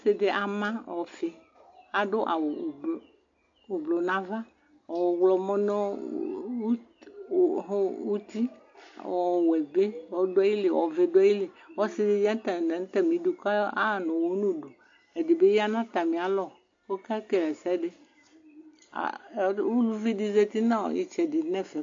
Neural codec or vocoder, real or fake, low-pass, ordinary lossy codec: none; real; 7.2 kHz; AAC, 48 kbps